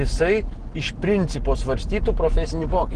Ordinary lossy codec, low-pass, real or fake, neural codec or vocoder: Opus, 16 kbps; 9.9 kHz; real; none